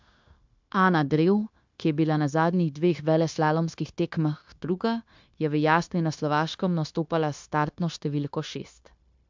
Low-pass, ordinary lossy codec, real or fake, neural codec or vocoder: 7.2 kHz; MP3, 64 kbps; fake; codec, 16 kHz, 0.9 kbps, LongCat-Audio-Codec